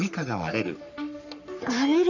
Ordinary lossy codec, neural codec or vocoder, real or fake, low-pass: none; codec, 44.1 kHz, 3.4 kbps, Pupu-Codec; fake; 7.2 kHz